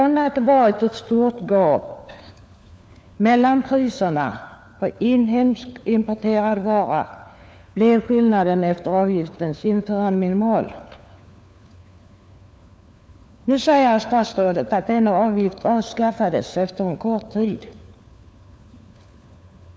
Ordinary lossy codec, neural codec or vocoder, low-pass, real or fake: none; codec, 16 kHz, 4 kbps, FunCodec, trained on LibriTTS, 50 frames a second; none; fake